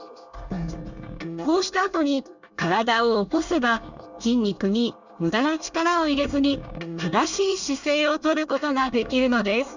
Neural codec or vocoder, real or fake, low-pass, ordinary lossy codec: codec, 24 kHz, 1 kbps, SNAC; fake; 7.2 kHz; none